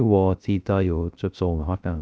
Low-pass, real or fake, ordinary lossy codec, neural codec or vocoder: none; fake; none; codec, 16 kHz, 0.3 kbps, FocalCodec